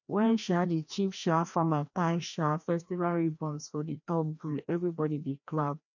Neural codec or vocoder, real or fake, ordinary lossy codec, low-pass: codec, 16 kHz, 1 kbps, FreqCodec, larger model; fake; none; 7.2 kHz